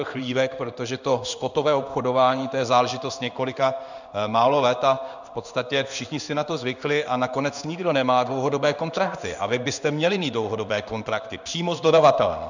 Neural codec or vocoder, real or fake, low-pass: codec, 16 kHz in and 24 kHz out, 1 kbps, XY-Tokenizer; fake; 7.2 kHz